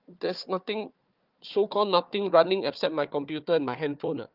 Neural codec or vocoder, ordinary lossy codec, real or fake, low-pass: codec, 16 kHz, 4 kbps, FunCodec, trained on Chinese and English, 50 frames a second; Opus, 24 kbps; fake; 5.4 kHz